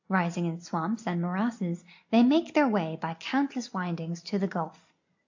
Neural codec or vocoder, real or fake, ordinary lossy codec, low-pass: none; real; AAC, 48 kbps; 7.2 kHz